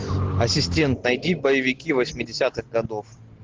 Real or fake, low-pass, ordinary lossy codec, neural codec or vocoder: real; 7.2 kHz; Opus, 16 kbps; none